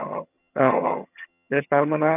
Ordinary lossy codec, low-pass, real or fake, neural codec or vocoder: none; 3.6 kHz; fake; vocoder, 22.05 kHz, 80 mel bands, HiFi-GAN